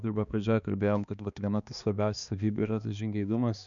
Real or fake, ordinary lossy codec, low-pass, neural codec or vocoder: fake; MP3, 96 kbps; 7.2 kHz; codec, 16 kHz, 2 kbps, X-Codec, HuBERT features, trained on balanced general audio